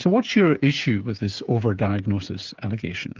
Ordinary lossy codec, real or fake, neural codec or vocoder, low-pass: Opus, 24 kbps; fake; codec, 16 kHz, 8 kbps, FreqCodec, smaller model; 7.2 kHz